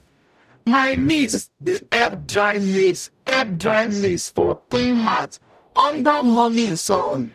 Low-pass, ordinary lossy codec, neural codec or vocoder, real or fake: 14.4 kHz; none; codec, 44.1 kHz, 0.9 kbps, DAC; fake